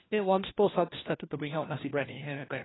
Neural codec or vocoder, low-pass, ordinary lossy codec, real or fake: codec, 16 kHz, 1 kbps, FunCodec, trained on LibriTTS, 50 frames a second; 7.2 kHz; AAC, 16 kbps; fake